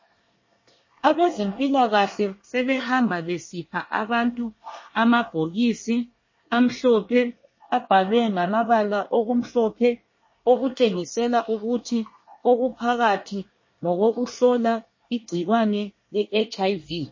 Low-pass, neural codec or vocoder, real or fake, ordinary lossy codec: 7.2 kHz; codec, 24 kHz, 1 kbps, SNAC; fake; MP3, 32 kbps